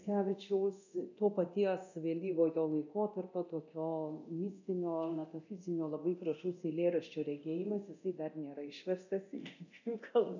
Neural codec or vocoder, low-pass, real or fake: codec, 24 kHz, 0.9 kbps, DualCodec; 7.2 kHz; fake